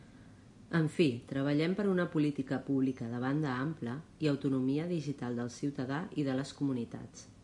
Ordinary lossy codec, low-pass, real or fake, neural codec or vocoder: AAC, 64 kbps; 10.8 kHz; real; none